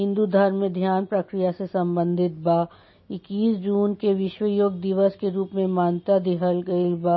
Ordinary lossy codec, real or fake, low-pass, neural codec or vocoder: MP3, 24 kbps; real; 7.2 kHz; none